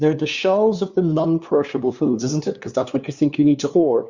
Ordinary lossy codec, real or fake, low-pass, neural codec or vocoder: Opus, 64 kbps; fake; 7.2 kHz; codec, 16 kHz, 2 kbps, FunCodec, trained on LibriTTS, 25 frames a second